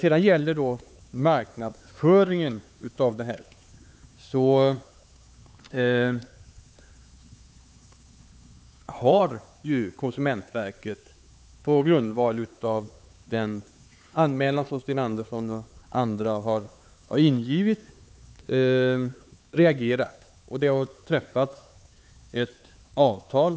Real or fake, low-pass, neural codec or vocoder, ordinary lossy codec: fake; none; codec, 16 kHz, 4 kbps, X-Codec, HuBERT features, trained on LibriSpeech; none